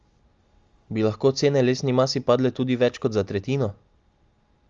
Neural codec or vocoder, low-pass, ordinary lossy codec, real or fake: none; 7.2 kHz; Opus, 32 kbps; real